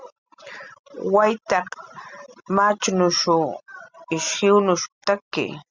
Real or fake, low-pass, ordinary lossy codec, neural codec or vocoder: real; 7.2 kHz; Opus, 64 kbps; none